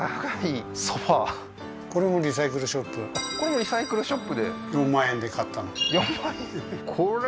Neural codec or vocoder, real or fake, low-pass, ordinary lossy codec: none; real; none; none